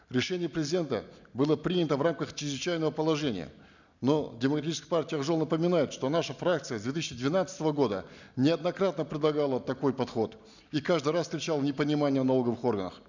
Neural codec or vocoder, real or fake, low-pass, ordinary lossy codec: none; real; 7.2 kHz; none